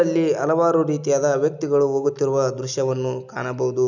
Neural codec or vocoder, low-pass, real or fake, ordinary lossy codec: none; 7.2 kHz; real; none